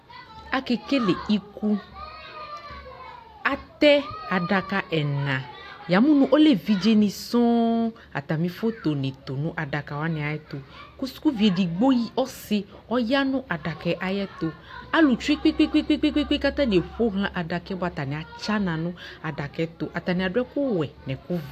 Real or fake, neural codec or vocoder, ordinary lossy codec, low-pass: real; none; AAC, 64 kbps; 14.4 kHz